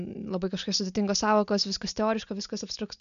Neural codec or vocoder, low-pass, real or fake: none; 7.2 kHz; real